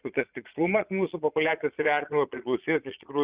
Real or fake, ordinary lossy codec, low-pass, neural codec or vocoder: fake; Opus, 64 kbps; 3.6 kHz; vocoder, 22.05 kHz, 80 mel bands, Vocos